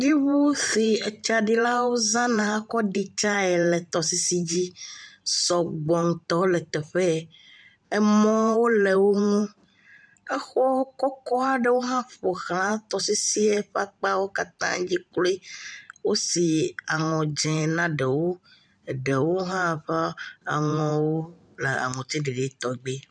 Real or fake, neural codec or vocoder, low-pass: fake; vocoder, 44.1 kHz, 128 mel bands every 512 samples, BigVGAN v2; 9.9 kHz